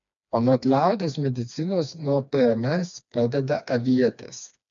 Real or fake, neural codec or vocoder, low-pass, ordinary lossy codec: fake; codec, 16 kHz, 2 kbps, FreqCodec, smaller model; 7.2 kHz; AAC, 64 kbps